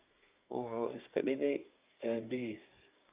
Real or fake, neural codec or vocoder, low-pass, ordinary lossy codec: fake; codec, 24 kHz, 1 kbps, SNAC; 3.6 kHz; Opus, 64 kbps